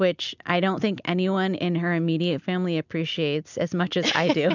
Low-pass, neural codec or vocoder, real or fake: 7.2 kHz; none; real